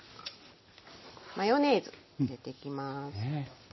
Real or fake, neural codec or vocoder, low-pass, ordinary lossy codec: real; none; 7.2 kHz; MP3, 24 kbps